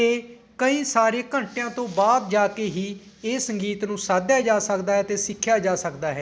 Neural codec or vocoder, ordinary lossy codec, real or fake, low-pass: none; none; real; none